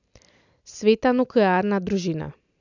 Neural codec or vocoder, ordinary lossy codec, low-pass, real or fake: none; none; 7.2 kHz; real